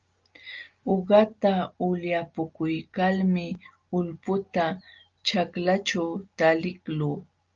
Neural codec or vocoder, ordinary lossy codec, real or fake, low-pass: none; Opus, 32 kbps; real; 7.2 kHz